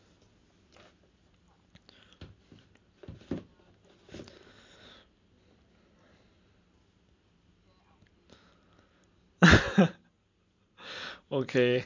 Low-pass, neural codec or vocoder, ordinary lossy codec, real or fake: 7.2 kHz; none; MP3, 48 kbps; real